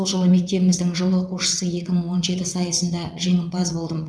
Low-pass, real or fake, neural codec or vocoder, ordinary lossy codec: none; fake; vocoder, 22.05 kHz, 80 mel bands, WaveNeXt; none